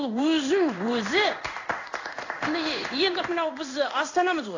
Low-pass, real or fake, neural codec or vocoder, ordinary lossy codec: 7.2 kHz; fake; codec, 16 kHz in and 24 kHz out, 1 kbps, XY-Tokenizer; AAC, 32 kbps